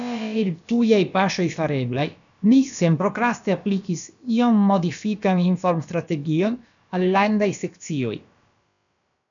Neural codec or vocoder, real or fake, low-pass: codec, 16 kHz, about 1 kbps, DyCAST, with the encoder's durations; fake; 7.2 kHz